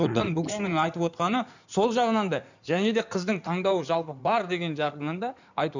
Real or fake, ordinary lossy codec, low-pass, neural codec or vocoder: fake; none; 7.2 kHz; codec, 16 kHz in and 24 kHz out, 2.2 kbps, FireRedTTS-2 codec